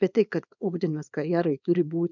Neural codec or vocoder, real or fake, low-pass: codec, 24 kHz, 0.9 kbps, WavTokenizer, small release; fake; 7.2 kHz